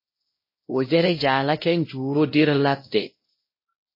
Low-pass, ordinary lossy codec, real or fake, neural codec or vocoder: 5.4 kHz; MP3, 24 kbps; fake; codec, 16 kHz, 0.5 kbps, X-Codec, WavLM features, trained on Multilingual LibriSpeech